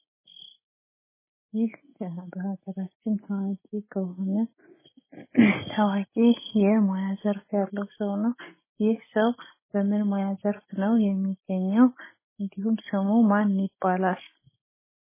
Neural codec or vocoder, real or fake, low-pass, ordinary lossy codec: none; real; 3.6 kHz; MP3, 16 kbps